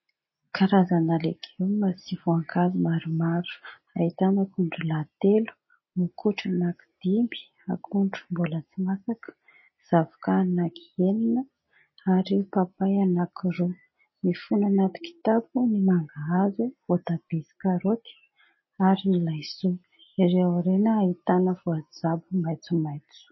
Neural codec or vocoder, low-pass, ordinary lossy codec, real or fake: none; 7.2 kHz; MP3, 24 kbps; real